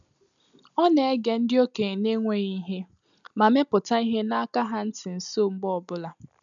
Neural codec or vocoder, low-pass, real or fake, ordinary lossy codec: none; 7.2 kHz; real; none